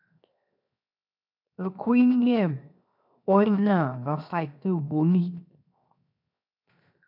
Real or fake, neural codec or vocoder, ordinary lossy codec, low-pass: fake; codec, 16 kHz, 0.7 kbps, FocalCodec; MP3, 48 kbps; 5.4 kHz